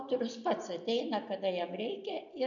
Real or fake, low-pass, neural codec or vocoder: real; 7.2 kHz; none